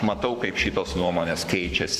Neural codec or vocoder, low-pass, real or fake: codec, 44.1 kHz, 7.8 kbps, Pupu-Codec; 14.4 kHz; fake